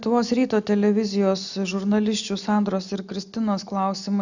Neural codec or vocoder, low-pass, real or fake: none; 7.2 kHz; real